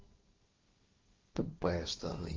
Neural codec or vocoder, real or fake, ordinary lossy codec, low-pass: codec, 16 kHz, 1.1 kbps, Voila-Tokenizer; fake; Opus, 32 kbps; 7.2 kHz